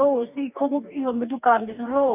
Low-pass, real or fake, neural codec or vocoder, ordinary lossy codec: 3.6 kHz; fake; codec, 44.1 kHz, 2.6 kbps, DAC; none